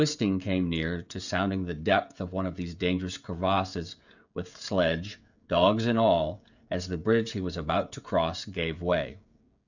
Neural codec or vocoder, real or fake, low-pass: codec, 16 kHz, 16 kbps, FreqCodec, smaller model; fake; 7.2 kHz